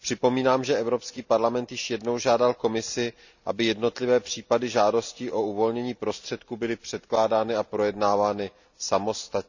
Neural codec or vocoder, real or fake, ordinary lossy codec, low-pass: none; real; none; 7.2 kHz